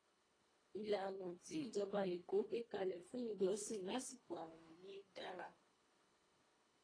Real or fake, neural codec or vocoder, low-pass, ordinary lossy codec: fake; codec, 24 kHz, 1.5 kbps, HILCodec; 9.9 kHz; AAC, 32 kbps